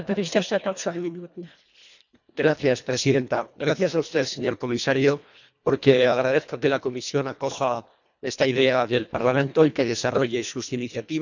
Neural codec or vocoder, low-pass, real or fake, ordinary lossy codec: codec, 24 kHz, 1.5 kbps, HILCodec; 7.2 kHz; fake; none